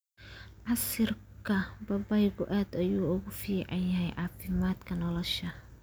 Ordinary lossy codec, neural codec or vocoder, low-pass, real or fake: none; none; none; real